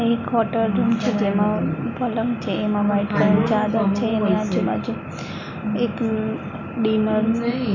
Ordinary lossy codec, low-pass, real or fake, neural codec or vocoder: none; 7.2 kHz; real; none